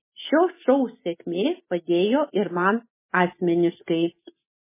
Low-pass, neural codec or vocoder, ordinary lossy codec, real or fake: 3.6 kHz; none; MP3, 16 kbps; real